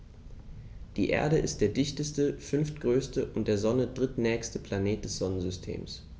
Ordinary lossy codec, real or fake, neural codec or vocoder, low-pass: none; real; none; none